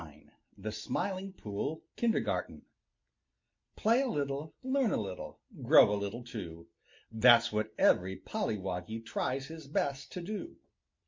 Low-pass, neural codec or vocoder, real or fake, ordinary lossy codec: 7.2 kHz; none; real; MP3, 48 kbps